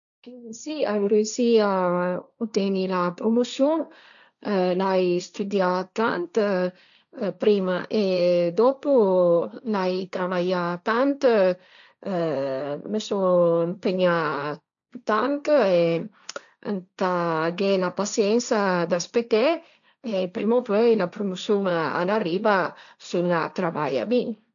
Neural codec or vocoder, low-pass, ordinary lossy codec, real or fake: codec, 16 kHz, 1.1 kbps, Voila-Tokenizer; 7.2 kHz; none; fake